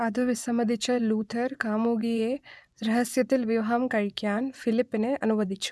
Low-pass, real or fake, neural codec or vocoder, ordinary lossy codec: none; fake; vocoder, 24 kHz, 100 mel bands, Vocos; none